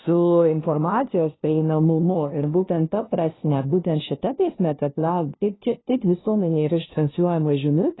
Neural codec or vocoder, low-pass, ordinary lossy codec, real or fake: codec, 16 kHz, 0.5 kbps, FunCodec, trained on LibriTTS, 25 frames a second; 7.2 kHz; AAC, 16 kbps; fake